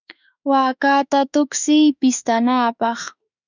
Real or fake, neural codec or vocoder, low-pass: fake; autoencoder, 48 kHz, 32 numbers a frame, DAC-VAE, trained on Japanese speech; 7.2 kHz